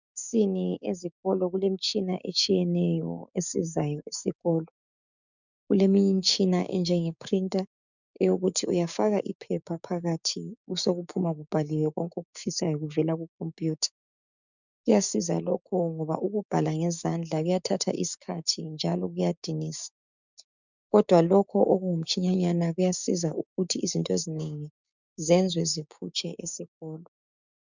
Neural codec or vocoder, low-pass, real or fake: codec, 16 kHz, 6 kbps, DAC; 7.2 kHz; fake